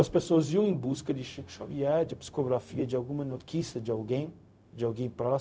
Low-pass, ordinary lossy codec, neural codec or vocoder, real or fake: none; none; codec, 16 kHz, 0.4 kbps, LongCat-Audio-Codec; fake